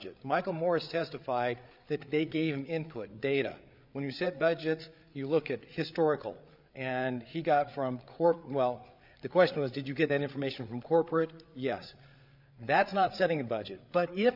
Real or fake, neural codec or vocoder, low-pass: fake; codec, 16 kHz, 8 kbps, FreqCodec, larger model; 5.4 kHz